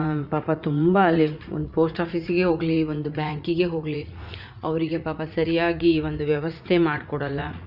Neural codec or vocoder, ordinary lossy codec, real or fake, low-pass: vocoder, 44.1 kHz, 80 mel bands, Vocos; none; fake; 5.4 kHz